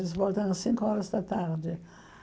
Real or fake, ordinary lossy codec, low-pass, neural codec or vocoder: real; none; none; none